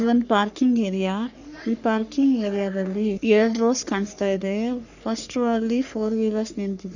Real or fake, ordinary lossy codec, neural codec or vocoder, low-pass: fake; none; codec, 44.1 kHz, 3.4 kbps, Pupu-Codec; 7.2 kHz